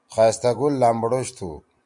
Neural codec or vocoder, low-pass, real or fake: none; 10.8 kHz; real